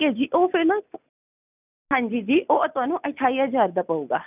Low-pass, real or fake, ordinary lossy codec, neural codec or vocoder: 3.6 kHz; real; none; none